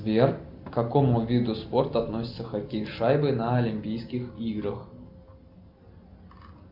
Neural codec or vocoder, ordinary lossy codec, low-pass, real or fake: none; AAC, 48 kbps; 5.4 kHz; real